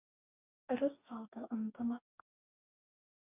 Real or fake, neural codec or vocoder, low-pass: fake; codec, 44.1 kHz, 2.6 kbps, DAC; 3.6 kHz